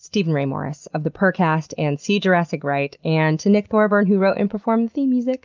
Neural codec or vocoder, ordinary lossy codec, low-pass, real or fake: none; Opus, 24 kbps; 7.2 kHz; real